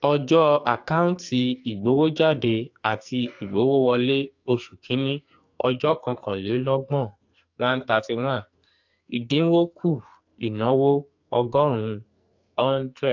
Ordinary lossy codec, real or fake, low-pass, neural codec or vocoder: AAC, 48 kbps; fake; 7.2 kHz; codec, 44.1 kHz, 2.6 kbps, SNAC